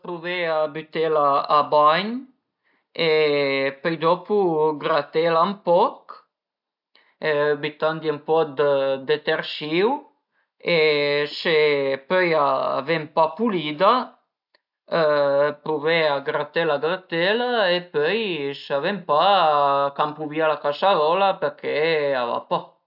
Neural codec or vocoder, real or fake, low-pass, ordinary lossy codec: none; real; 5.4 kHz; AAC, 48 kbps